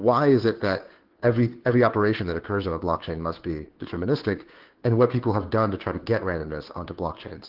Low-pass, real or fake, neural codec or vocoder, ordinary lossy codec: 5.4 kHz; fake; codec, 16 kHz, 2 kbps, FunCodec, trained on Chinese and English, 25 frames a second; Opus, 16 kbps